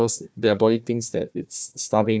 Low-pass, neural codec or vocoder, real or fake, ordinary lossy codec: none; codec, 16 kHz, 1 kbps, FunCodec, trained on Chinese and English, 50 frames a second; fake; none